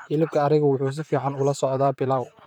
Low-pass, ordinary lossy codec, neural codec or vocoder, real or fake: 19.8 kHz; none; vocoder, 44.1 kHz, 128 mel bands, Pupu-Vocoder; fake